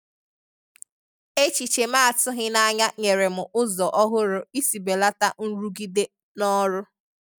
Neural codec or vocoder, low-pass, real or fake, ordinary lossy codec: none; none; real; none